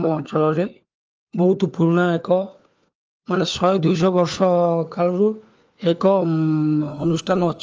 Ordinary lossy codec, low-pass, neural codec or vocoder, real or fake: Opus, 24 kbps; 7.2 kHz; codec, 16 kHz, 4 kbps, FunCodec, trained on LibriTTS, 50 frames a second; fake